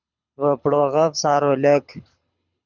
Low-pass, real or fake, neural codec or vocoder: 7.2 kHz; fake; codec, 24 kHz, 6 kbps, HILCodec